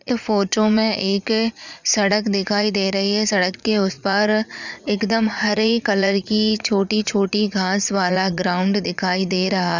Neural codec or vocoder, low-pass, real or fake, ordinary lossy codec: vocoder, 44.1 kHz, 80 mel bands, Vocos; 7.2 kHz; fake; none